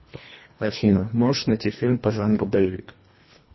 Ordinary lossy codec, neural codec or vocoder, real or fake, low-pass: MP3, 24 kbps; codec, 24 kHz, 1.5 kbps, HILCodec; fake; 7.2 kHz